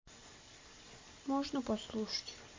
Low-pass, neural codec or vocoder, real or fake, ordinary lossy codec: 7.2 kHz; none; real; MP3, 48 kbps